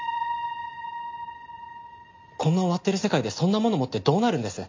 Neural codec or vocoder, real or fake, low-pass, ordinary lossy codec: none; real; 7.2 kHz; MP3, 48 kbps